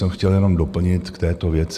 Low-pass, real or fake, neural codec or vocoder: 14.4 kHz; real; none